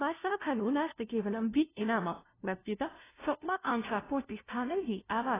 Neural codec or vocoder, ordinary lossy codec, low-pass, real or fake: codec, 16 kHz, 0.5 kbps, FunCodec, trained on LibriTTS, 25 frames a second; AAC, 16 kbps; 3.6 kHz; fake